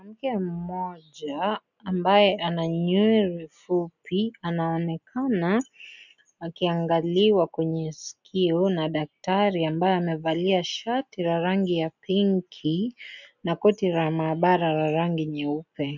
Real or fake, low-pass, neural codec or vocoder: real; 7.2 kHz; none